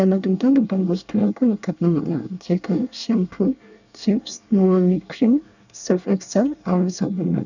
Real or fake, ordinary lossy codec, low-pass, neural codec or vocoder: fake; none; 7.2 kHz; codec, 24 kHz, 1 kbps, SNAC